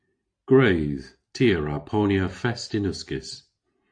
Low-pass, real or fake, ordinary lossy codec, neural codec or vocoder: 9.9 kHz; real; AAC, 48 kbps; none